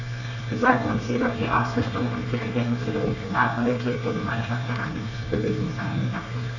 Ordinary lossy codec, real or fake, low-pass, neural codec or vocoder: none; fake; 7.2 kHz; codec, 24 kHz, 1 kbps, SNAC